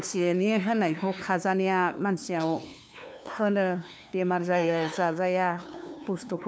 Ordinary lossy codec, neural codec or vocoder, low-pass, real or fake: none; codec, 16 kHz, 2 kbps, FunCodec, trained on LibriTTS, 25 frames a second; none; fake